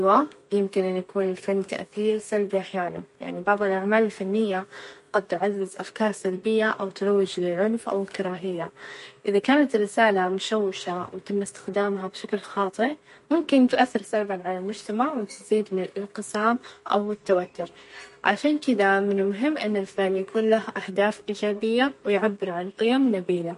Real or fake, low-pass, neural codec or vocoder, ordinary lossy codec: fake; 14.4 kHz; codec, 32 kHz, 1.9 kbps, SNAC; MP3, 48 kbps